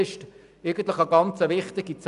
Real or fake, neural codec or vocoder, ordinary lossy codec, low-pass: real; none; none; 10.8 kHz